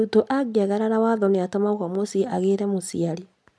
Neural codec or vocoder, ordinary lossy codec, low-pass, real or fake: none; none; none; real